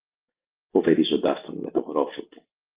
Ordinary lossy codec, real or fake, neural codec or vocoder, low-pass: Opus, 32 kbps; real; none; 3.6 kHz